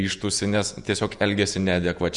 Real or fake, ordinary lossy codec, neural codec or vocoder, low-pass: fake; Opus, 64 kbps; vocoder, 48 kHz, 128 mel bands, Vocos; 10.8 kHz